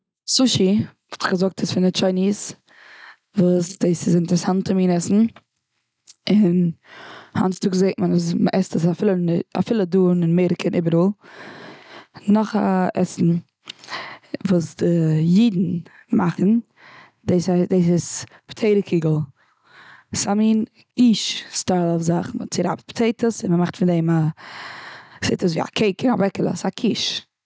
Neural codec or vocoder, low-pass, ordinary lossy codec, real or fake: none; none; none; real